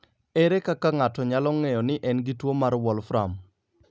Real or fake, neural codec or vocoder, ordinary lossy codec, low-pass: real; none; none; none